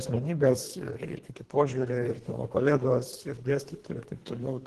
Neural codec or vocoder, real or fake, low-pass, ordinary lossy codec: codec, 24 kHz, 1.5 kbps, HILCodec; fake; 10.8 kHz; Opus, 16 kbps